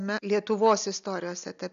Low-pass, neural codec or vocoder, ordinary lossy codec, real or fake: 7.2 kHz; none; AAC, 96 kbps; real